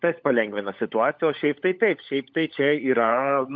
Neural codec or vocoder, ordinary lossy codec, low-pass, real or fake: codec, 16 kHz, 16 kbps, FunCodec, trained on LibriTTS, 50 frames a second; MP3, 64 kbps; 7.2 kHz; fake